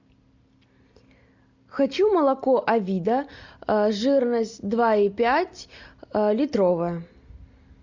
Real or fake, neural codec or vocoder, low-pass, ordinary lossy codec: real; none; 7.2 kHz; MP3, 64 kbps